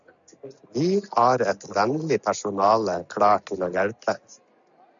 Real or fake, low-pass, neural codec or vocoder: real; 7.2 kHz; none